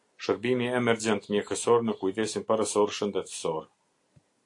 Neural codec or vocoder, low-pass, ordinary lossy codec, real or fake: none; 10.8 kHz; AAC, 48 kbps; real